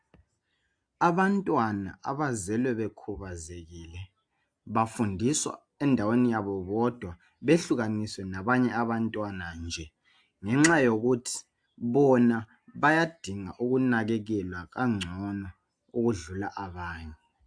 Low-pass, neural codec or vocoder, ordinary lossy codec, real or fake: 9.9 kHz; none; AAC, 64 kbps; real